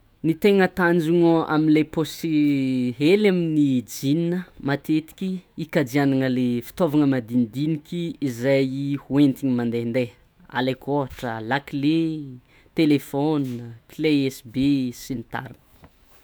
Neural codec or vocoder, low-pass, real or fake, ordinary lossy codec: autoencoder, 48 kHz, 128 numbers a frame, DAC-VAE, trained on Japanese speech; none; fake; none